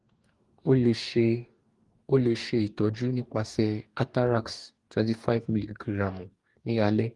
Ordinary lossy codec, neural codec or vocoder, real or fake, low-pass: Opus, 24 kbps; codec, 44.1 kHz, 2.6 kbps, DAC; fake; 10.8 kHz